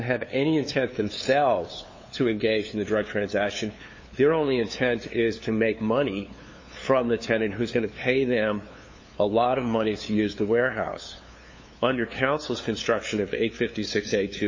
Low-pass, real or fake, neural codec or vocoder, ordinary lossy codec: 7.2 kHz; fake; codec, 16 kHz, 2 kbps, FunCodec, trained on LibriTTS, 25 frames a second; MP3, 32 kbps